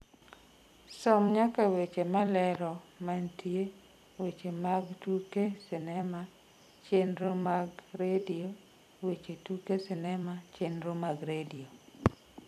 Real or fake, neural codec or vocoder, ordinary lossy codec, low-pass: fake; vocoder, 44.1 kHz, 128 mel bands every 256 samples, BigVGAN v2; none; 14.4 kHz